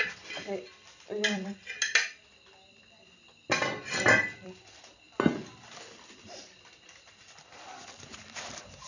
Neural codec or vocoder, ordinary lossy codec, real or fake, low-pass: vocoder, 44.1 kHz, 128 mel bands every 256 samples, BigVGAN v2; none; fake; 7.2 kHz